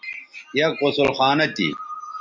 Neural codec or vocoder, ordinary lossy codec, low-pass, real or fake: none; MP3, 64 kbps; 7.2 kHz; real